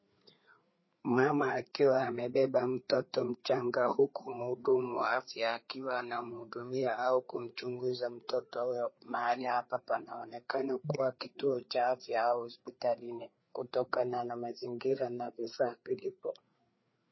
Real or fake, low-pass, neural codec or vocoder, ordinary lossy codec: fake; 7.2 kHz; codec, 16 kHz, 4 kbps, FreqCodec, larger model; MP3, 24 kbps